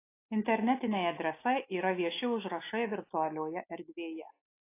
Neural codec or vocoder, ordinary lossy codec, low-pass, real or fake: none; AAC, 24 kbps; 3.6 kHz; real